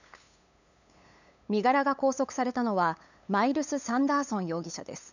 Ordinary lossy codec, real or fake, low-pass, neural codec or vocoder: none; fake; 7.2 kHz; codec, 16 kHz, 8 kbps, FunCodec, trained on LibriTTS, 25 frames a second